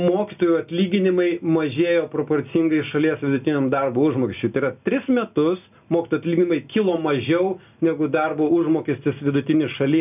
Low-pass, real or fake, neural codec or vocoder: 3.6 kHz; real; none